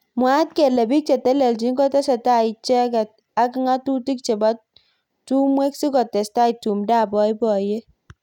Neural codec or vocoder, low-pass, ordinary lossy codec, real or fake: none; 19.8 kHz; none; real